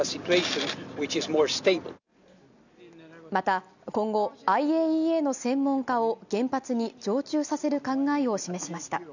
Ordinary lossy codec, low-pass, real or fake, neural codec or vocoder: none; 7.2 kHz; real; none